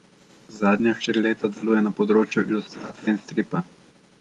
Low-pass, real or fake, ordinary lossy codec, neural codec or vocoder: 10.8 kHz; real; Opus, 32 kbps; none